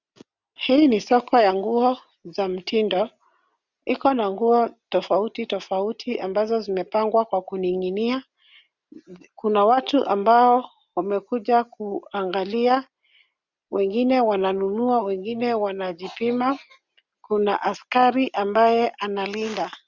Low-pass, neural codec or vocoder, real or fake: 7.2 kHz; none; real